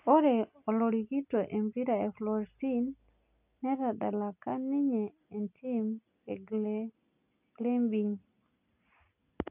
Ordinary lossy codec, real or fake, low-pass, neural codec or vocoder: none; real; 3.6 kHz; none